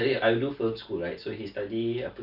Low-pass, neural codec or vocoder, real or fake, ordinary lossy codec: 5.4 kHz; none; real; none